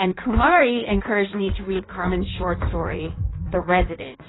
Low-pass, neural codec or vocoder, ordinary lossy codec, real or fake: 7.2 kHz; codec, 16 kHz in and 24 kHz out, 1.1 kbps, FireRedTTS-2 codec; AAC, 16 kbps; fake